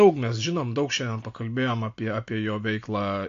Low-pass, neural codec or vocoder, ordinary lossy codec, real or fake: 7.2 kHz; none; AAC, 48 kbps; real